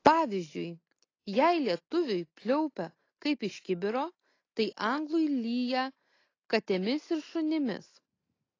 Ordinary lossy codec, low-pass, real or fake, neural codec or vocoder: AAC, 32 kbps; 7.2 kHz; real; none